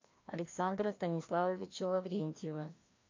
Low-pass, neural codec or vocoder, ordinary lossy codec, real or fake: 7.2 kHz; codec, 16 kHz, 1 kbps, FreqCodec, larger model; MP3, 48 kbps; fake